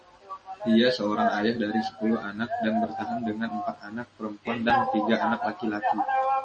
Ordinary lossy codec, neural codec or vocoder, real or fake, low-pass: MP3, 32 kbps; none; real; 10.8 kHz